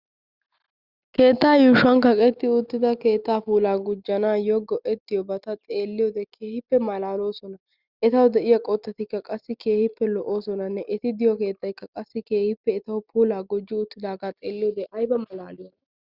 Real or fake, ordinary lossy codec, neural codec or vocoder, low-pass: real; Opus, 64 kbps; none; 5.4 kHz